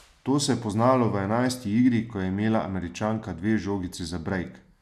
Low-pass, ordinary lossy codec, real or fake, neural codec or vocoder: 14.4 kHz; none; real; none